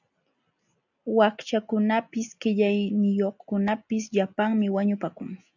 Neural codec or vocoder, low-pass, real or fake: none; 7.2 kHz; real